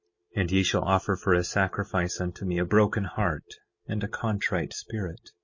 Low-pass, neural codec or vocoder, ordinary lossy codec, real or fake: 7.2 kHz; none; MP3, 32 kbps; real